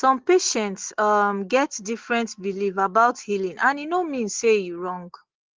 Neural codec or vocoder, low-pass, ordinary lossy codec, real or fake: none; 7.2 kHz; Opus, 16 kbps; real